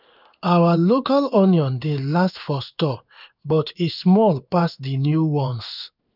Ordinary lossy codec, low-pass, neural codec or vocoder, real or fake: none; 5.4 kHz; codec, 16 kHz in and 24 kHz out, 1 kbps, XY-Tokenizer; fake